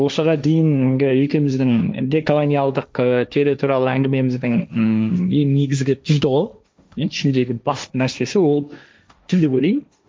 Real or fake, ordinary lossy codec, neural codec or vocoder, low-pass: fake; none; codec, 16 kHz, 1.1 kbps, Voila-Tokenizer; none